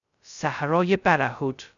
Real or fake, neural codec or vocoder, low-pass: fake; codec, 16 kHz, 0.2 kbps, FocalCodec; 7.2 kHz